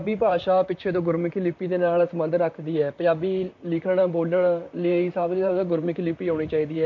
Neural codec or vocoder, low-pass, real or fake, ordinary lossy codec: vocoder, 44.1 kHz, 128 mel bands, Pupu-Vocoder; 7.2 kHz; fake; MP3, 48 kbps